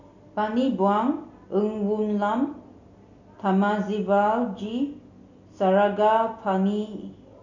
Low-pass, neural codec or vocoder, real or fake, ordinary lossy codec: 7.2 kHz; none; real; none